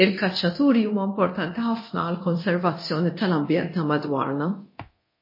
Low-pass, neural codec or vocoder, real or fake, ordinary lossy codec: 5.4 kHz; codec, 24 kHz, 0.9 kbps, DualCodec; fake; MP3, 24 kbps